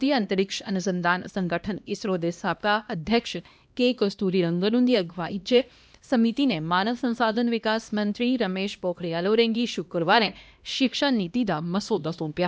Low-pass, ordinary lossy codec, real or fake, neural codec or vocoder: none; none; fake; codec, 16 kHz, 1 kbps, X-Codec, HuBERT features, trained on LibriSpeech